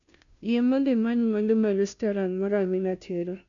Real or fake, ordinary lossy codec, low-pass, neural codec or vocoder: fake; none; 7.2 kHz; codec, 16 kHz, 0.5 kbps, FunCodec, trained on Chinese and English, 25 frames a second